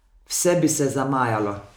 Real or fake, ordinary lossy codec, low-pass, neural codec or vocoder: real; none; none; none